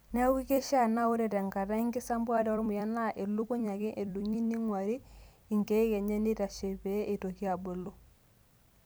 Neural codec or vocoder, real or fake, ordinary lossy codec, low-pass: vocoder, 44.1 kHz, 128 mel bands every 256 samples, BigVGAN v2; fake; none; none